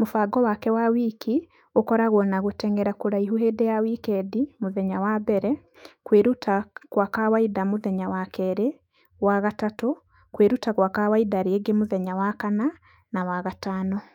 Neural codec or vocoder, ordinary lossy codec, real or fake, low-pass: autoencoder, 48 kHz, 128 numbers a frame, DAC-VAE, trained on Japanese speech; none; fake; 19.8 kHz